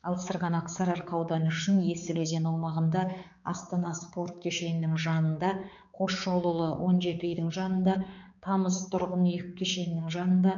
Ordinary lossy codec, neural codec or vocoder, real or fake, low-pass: none; codec, 16 kHz, 4 kbps, X-Codec, HuBERT features, trained on balanced general audio; fake; 7.2 kHz